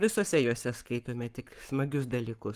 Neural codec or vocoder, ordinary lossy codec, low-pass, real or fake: codec, 44.1 kHz, 7.8 kbps, Pupu-Codec; Opus, 32 kbps; 14.4 kHz; fake